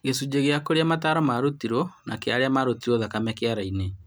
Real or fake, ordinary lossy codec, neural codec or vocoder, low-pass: real; none; none; none